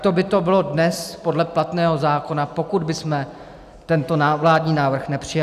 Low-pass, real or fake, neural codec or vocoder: 14.4 kHz; real; none